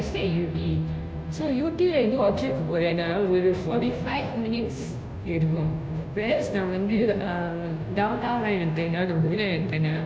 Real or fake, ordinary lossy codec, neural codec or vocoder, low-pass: fake; none; codec, 16 kHz, 0.5 kbps, FunCodec, trained on Chinese and English, 25 frames a second; none